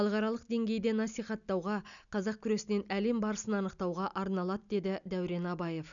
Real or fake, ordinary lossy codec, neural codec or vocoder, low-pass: real; none; none; 7.2 kHz